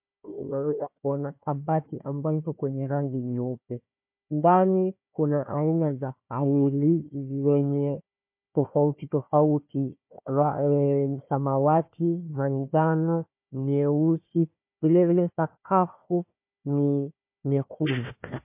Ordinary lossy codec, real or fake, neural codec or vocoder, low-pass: MP3, 32 kbps; fake; codec, 16 kHz, 1 kbps, FunCodec, trained on Chinese and English, 50 frames a second; 3.6 kHz